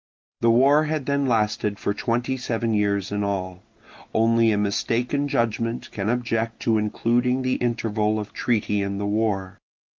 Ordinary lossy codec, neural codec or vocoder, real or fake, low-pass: Opus, 24 kbps; none; real; 7.2 kHz